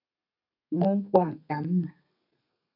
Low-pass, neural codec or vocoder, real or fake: 5.4 kHz; codec, 32 kHz, 1.9 kbps, SNAC; fake